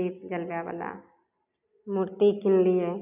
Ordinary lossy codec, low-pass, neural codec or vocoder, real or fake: none; 3.6 kHz; none; real